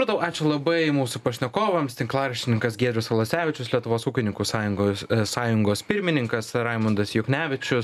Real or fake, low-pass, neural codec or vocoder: real; 14.4 kHz; none